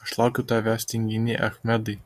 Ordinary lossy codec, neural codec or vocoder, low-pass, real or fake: MP3, 64 kbps; none; 14.4 kHz; real